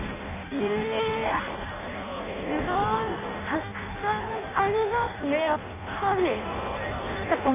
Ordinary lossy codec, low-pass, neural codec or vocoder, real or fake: none; 3.6 kHz; codec, 16 kHz in and 24 kHz out, 0.6 kbps, FireRedTTS-2 codec; fake